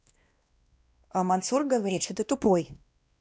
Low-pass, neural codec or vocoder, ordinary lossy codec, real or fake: none; codec, 16 kHz, 1 kbps, X-Codec, WavLM features, trained on Multilingual LibriSpeech; none; fake